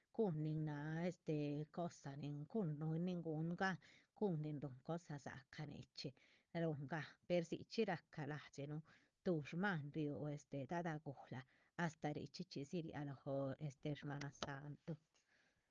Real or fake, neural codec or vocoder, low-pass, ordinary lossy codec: fake; codec, 16 kHz, 4 kbps, FunCodec, trained on Chinese and English, 50 frames a second; 7.2 kHz; Opus, 24 kbps